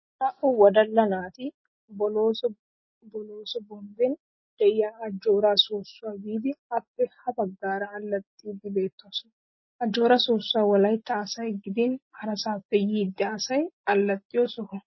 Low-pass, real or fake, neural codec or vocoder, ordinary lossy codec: 7.2 kHz; real; none; MP3, 24 kbps